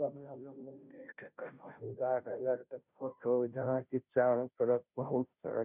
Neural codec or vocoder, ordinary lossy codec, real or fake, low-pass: codec, 16 kHz, 0.5 kbps, FunCodec, trained on Chinese and English, 25 frames a second; none; fake; 3.6 kHz